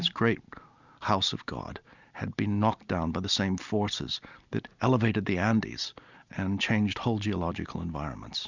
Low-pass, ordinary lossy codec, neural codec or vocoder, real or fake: 7.2 kHz; Opus, 64 kbps; none; real